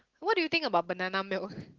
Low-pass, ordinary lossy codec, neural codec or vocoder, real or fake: 7.2 kHz; Opus, 16 kbps; none; real